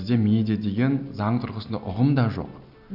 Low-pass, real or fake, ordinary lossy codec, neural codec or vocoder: 5.4 kHz; real; none; none